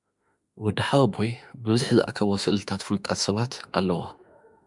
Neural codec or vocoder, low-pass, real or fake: autoencoder, 48 kHz, 32 numbers a frame, DAC-VAE, trained on Japanese speech; 10.8 kHz; fake